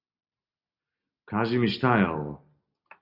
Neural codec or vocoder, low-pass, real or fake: none; 5.4 kHz; real